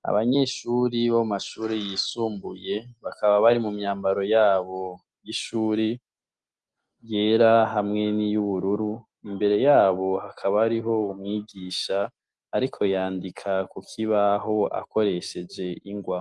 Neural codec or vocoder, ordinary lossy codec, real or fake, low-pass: none; Opus, 24 kbps; real; 10.8 kHz